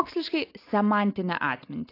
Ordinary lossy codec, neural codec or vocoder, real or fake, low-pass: AAC, 32 kbps; none; real; 5.4 kHz